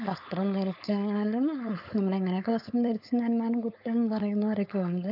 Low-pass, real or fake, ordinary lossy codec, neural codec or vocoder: 5.4 kHz; fake; none; codec, 16 kHz, 4.8 kbps, FACodec